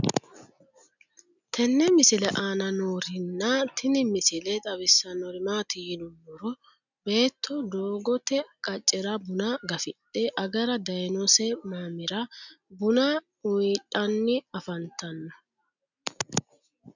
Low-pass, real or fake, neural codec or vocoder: 7.2 kHz; real; none